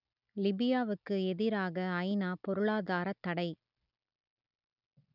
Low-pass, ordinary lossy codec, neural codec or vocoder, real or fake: 5.4 kHz; none; none; real